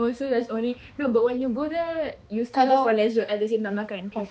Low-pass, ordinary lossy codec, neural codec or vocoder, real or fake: none; none; codec, 16 kHz, 2 kbps, X-Codec, HuBERT features, trained on balanced general audio; fake